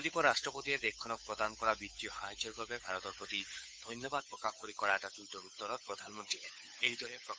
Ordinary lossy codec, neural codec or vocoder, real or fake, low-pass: none; codec, 16 kHz, 8 kbps, FunCodec, trained on Chinese and English, 25 frames a second; fake; none